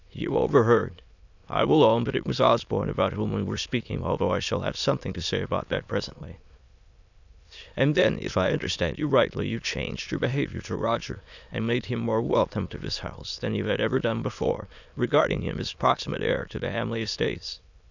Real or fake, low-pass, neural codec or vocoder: fake; 7.2 kHz; autoencoder, 22.05 kHz, a latent of 192 numbers a frame, VITS, trained on many speakers